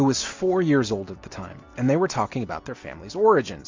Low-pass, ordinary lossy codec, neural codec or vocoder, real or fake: 7.2 kHz; MP3, 48 kbps; none; real